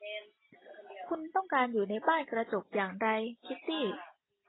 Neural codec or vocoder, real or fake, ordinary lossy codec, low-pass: none; real; AAC, 16 kbps; 7.2 kHz